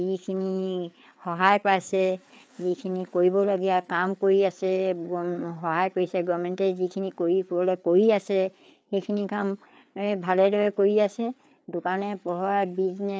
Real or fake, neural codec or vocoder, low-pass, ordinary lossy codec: fake; codec, 16 kHz, 4 kbps, FreqCodec, larger model; none; none